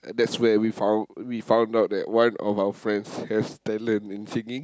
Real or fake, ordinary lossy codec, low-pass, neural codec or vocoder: real; none; none; none